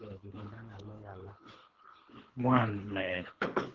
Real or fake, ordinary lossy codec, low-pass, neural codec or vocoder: fake; Opus, 16 kbps; 7.2 kHz; codec, 24 kHz, 3 kbps, HILCodec